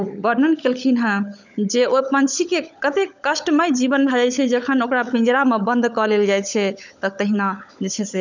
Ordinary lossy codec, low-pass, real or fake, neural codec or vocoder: none; 7.2 kHz; fake; codec, 16 kHz, 16 kbps, FunCodec, trained on LibriTTS, 50 frames a second